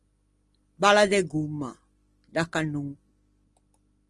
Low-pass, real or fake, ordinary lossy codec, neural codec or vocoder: 10.8 kHz; real; Opus, 24 kbps; none